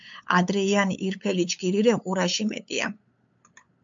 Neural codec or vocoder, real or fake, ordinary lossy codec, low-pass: codec, 16 kHz, 8 kbps, FunCodec, trained on LibriTTS, 25 frames a second; fake; MP3, 64 kbps; 7.2 kHz